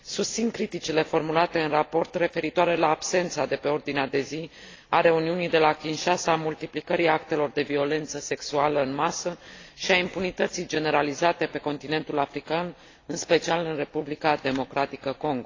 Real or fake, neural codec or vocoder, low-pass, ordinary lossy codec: real; none; 7.2 kHz; AAC, 32 kbps